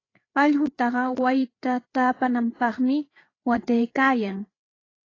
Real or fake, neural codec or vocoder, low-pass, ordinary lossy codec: fake; codec, 16 kHz, 8 kbps, FreqCodec, larger model; 7.2 kHz; AAC, 32 kbps